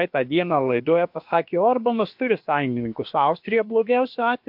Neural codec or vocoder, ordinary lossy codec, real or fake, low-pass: codec, 16 kHz, about 1 kbps, DyCAST, with the encoder's durations; MP3, 48 kbps; fake; 5.4 kHz